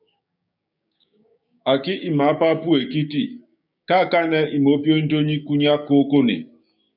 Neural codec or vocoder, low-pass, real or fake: codec, 16 kHz, 6 kbps, DAC; 5.4 kHz; fake